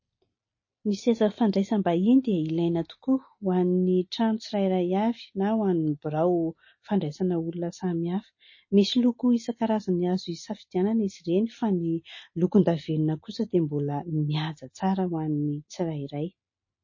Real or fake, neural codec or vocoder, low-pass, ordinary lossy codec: real; none; 7.2 kHz; MP3, 32 kbps